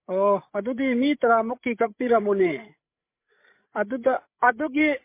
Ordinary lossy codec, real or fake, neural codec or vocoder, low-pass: MP3, 24 kbps; fake; codec, 16 kHz, 8 kbps, FreqCodec, larger model; 3.6 kHz